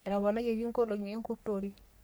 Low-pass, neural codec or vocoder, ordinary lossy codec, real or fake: none; codec, 44.1 kHz, 3.4 kbps, Pupu-Codec; none; fake